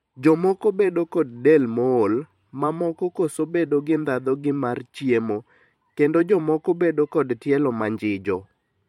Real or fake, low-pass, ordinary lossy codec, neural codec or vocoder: fake; 19.8 kHz; MP3, 64 kbps; vocoder, 44.1 kHz, 128 mel bands every 512 samples, BigVGAN v2